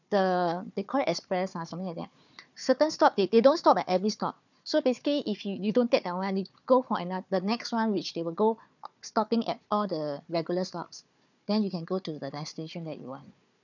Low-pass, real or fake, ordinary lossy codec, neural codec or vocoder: 7.2 kHz; fake; none; codec, 16 kHz, 4 kbps, FunCodec, trained on Chinese and English, 50 frames a second